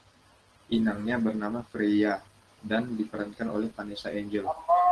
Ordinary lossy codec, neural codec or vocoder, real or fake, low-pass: Opus, 16 kbps; none; real; 10.8 kHz